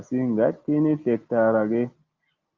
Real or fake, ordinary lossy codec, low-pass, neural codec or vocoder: real; Opus, 24 kbps; 7.2 kHz; none